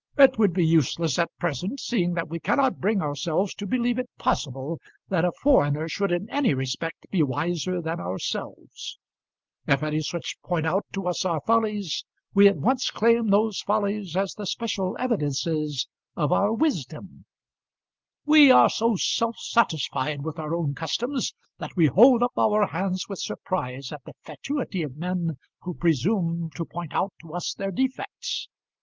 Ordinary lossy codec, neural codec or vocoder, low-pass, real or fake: Opus, 32 kbps; none; 7.2 kHz; real